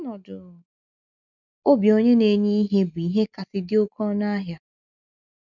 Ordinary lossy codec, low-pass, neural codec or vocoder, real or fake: none; 7.2 kHz; none; real